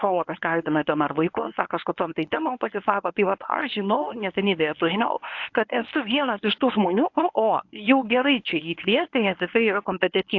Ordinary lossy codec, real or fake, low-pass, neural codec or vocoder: AAC, 48 kbps; fake; 7.2 kHz; codec, 24 kHz, 0.9 kbps, WavTokenizer, medium speech release version 1